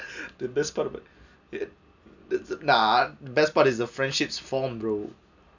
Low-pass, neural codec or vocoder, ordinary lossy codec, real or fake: 7.2 kHz; none; none; real